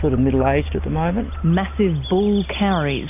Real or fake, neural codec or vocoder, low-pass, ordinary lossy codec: real; none; 3.6 kHz; AAC, 32 kbps